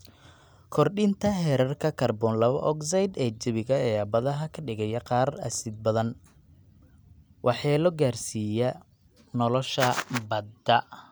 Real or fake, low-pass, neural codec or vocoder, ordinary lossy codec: real; none; none; none